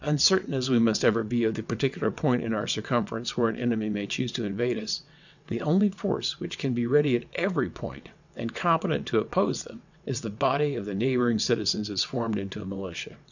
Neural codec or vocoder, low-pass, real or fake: vocoder, 22.05 kHz, 80 mel bands, WaveNeXt; 7.2 kHz; fake